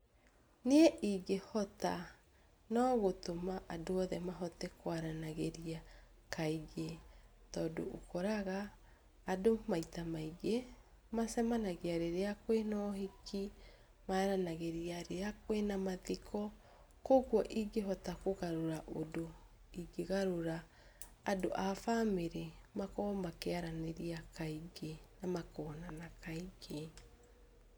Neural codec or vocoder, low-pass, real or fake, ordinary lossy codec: none; none; real; none